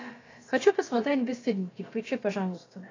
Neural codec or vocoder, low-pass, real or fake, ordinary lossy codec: codec, 16 kHz, about 1 kbps, DyCAST, with the encoder's durations; 7.2 kHz; fake; AAC, 32 kbps